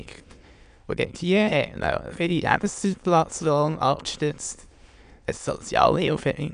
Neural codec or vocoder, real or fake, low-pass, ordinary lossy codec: autoencoder, 22.05 kHz, a latent of 192 numbers a frame, VITS, trained on many speakers; fake; 9.9 kHz; none